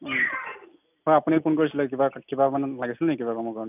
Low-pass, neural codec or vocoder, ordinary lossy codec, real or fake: 3.6 kHz; none; none; real